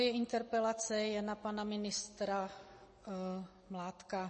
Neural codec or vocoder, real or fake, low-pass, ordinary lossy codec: none; real; 10.8 kHz; MP3, 32 kbps